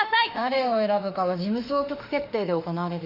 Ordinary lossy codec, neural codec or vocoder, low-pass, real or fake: Opus, 64 kbps; autoencoder, 48 kHz, 32 numbers a frame, DAC-VAE, trained on Japanese speech; 5.4 kHz; fake